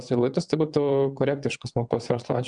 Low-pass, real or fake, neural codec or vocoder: 9.9 kHz; fake; vocoder, 22.05 kHz, 80 mel bands, WaveNeXt